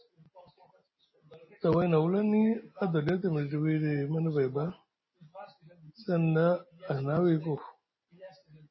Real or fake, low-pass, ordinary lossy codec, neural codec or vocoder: real; 7.2 kHz; MP3, 24 kbps; none